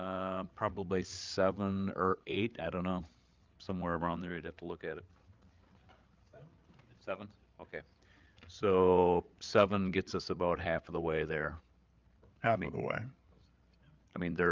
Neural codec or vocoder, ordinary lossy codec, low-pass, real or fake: codec, 16 kHz, 8 kbps, FreqCodec, larger model; Opus, 24 kbps; 7.2 kHz; fake